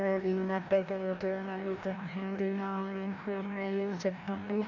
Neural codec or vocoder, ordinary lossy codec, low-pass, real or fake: codec, 16 kHz, 1 kbps, FreqCodec, larger model; none; 7.2 kHz; fake